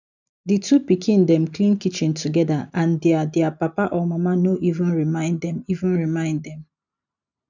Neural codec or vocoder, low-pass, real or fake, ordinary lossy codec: none; 7.2 kHz; real; none